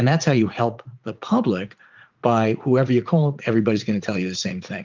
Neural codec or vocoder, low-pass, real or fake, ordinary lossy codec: vocoder, 44.1 kHz, 80 mel bands, Vocos; 7.2 kHz; fake; Opus, 32 kbps